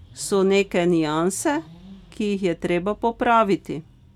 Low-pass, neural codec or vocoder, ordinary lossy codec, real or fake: 19.8 kHz; none; none; real